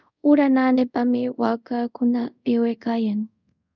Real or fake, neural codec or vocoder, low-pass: fake; codec, 24 kHz, 0.5 kbps, DualCodec; 7.2 kHz